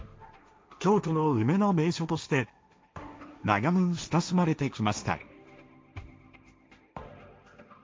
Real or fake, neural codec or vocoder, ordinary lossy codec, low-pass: fake; codec, 16 kHz, 1.1 kbps, Voila-Tokenizer; none; none